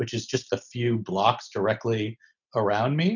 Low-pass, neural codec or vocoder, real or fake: 7.2 kHz; none; real